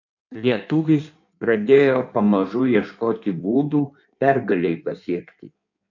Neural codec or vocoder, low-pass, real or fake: codec, 16 kHz in and 24 kHz out, 1.1 kbps, FireRedTTS-2 codec; 7.2 kHz; fake